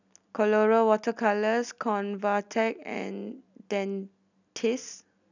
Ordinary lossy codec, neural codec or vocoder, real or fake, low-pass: none; none; real; 7.2 kHz